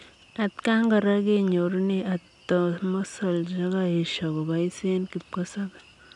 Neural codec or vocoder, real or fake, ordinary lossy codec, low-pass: none; real; none; 10.8 kHz